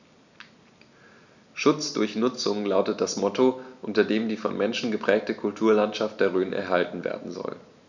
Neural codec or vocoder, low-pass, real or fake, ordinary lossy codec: none; 7.2 kHz; real; none